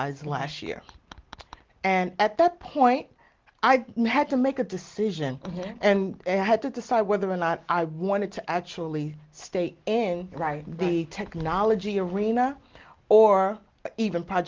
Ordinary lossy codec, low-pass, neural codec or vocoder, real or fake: Opus, 16 kbps; 7.2 kHz; none; real